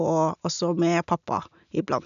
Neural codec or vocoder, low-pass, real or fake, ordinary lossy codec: none; 7.2 kHz; real; none